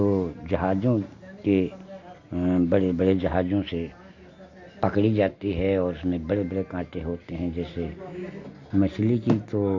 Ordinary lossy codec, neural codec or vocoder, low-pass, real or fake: AAC, 48 kbps; none; 7.2 kHz; real